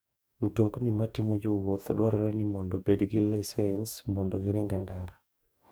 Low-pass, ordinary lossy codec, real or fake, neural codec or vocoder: none; none; fake; codec, 44.1 kHz, 2.6 kbps, DAC